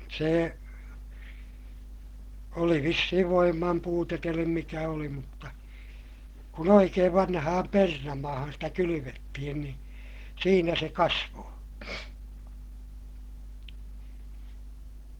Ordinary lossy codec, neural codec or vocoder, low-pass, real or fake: Opus, 16 kbps; none; 19.8 kHz; real